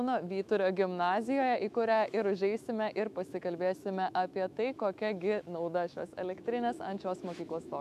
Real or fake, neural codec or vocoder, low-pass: fake; autoencoder, 48 kHz, 128 numbers a frame, DAC-VAE, trained on Japanese speech; 14.4 kHz